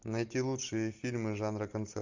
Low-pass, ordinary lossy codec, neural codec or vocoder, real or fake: 7.2 kHz; AAC, 48 kbps; none; real